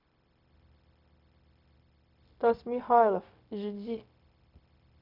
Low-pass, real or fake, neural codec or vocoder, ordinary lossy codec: 5.4 kHz; fake; codec, 16 kHz, 0.4 kbps, LongCat-Audio-Codec; none